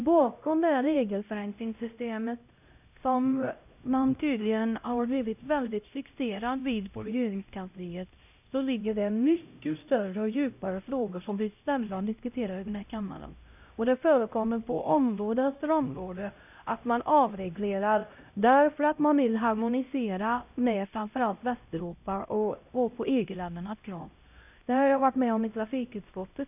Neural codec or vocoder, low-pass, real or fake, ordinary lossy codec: codec, 16 kHz, 0.5 kbps, X-Codec, HuBERT features, trained on LibriSpeech; 3.6 kHz; fake; none